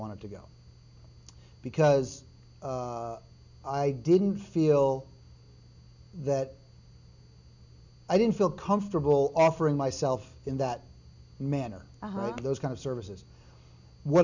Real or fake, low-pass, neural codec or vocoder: real; 7.2 kHz; none